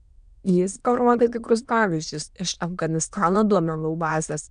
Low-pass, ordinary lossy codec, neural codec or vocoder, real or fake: 9.9 kHz; MP3, 96 kbps; autoencoder, 22.05 kHz, a latent of 192 numbers a frame, VITS, trained on many speakers; fake